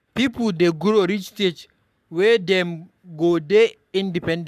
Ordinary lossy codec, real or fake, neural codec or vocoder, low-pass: none; fake; codec, 44.1 kHz, 7.8 kbps, Pupu-Codec; 14.4 kHz